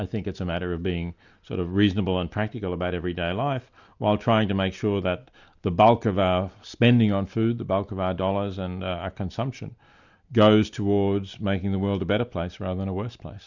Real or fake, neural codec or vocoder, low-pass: real; none; 7.2 kHz